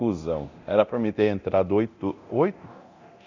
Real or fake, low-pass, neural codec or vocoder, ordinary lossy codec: fake; 7.2 kHz; codec, 24 kHz, 0.9 kbps, DualCodec; none